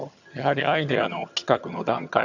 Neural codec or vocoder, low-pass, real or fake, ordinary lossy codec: vocoder, 22.05 kHz, 80 mel bands, HiFi-GAN; 7.2 kHz; fake; none